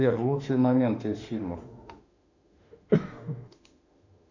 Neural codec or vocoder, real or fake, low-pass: autoencoder, 48 kHz, 32 numbers a frame, DAC-VAE, trained on Japanese speech; fake; 7.2 kHz